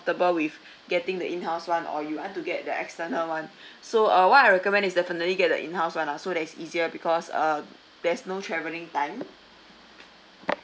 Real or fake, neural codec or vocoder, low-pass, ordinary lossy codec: real; none; none; none